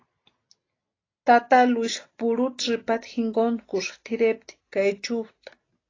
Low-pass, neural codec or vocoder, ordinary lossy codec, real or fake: 7.2 kHz; none; AAC, 32 kbps; real